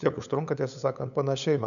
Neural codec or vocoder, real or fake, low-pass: codec, 16 kHz, 8 kbps, FunCodec, trained on LibriTTS, 25 frames a second; fake; 7.2 kHz